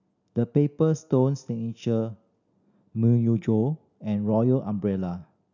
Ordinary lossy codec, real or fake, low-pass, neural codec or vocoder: none; real; 7.2 kHz; none